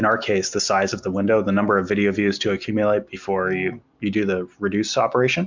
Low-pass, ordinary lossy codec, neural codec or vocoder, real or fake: 7.2 kHz; MP3, 64 kbps; none; real